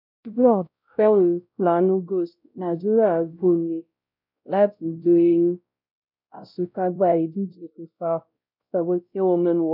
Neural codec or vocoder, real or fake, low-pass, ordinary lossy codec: codec, 16 kHz, 0.5 kbps, X-Codec, WavLM features, trained on Multilingual LibriSpeech; fake; 5.4 kHz; none